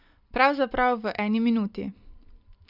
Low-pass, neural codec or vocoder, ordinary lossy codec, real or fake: 5.4 kHz; none; none; real